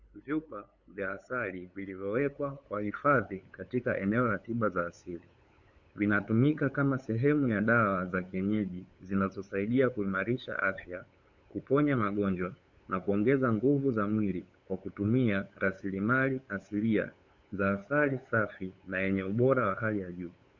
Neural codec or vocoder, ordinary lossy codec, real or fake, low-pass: codec, 16 kHz, 8 kbps, FunCodec, trained on LibriTTS, 25 frames a second; Opus, 64 kbps; fake; 7.2 kHz